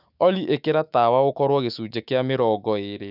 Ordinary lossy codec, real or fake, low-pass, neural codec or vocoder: none; fake; 5.4 kHz; autoencoder, 48 kHz, 128 numbers a frame, DAC-VAE, trained on Japanese speech